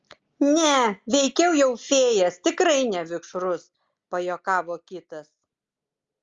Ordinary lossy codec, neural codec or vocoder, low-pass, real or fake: Opus, 32 kbps; none; 7.2 kHz; real